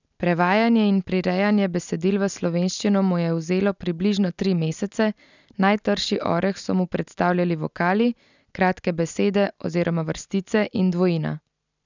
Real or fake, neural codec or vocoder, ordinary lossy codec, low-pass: real; none; none; 7.2 kHz